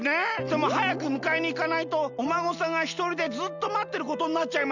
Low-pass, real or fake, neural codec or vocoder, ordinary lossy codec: 7.2 kHz; real; none; none